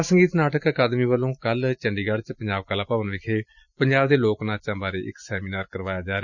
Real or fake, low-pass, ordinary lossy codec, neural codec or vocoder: real; 7.2 kHz; none; none